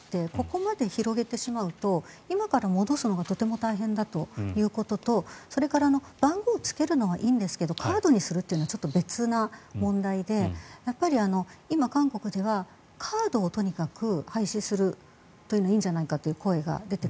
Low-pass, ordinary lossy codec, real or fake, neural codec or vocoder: none; none; real; none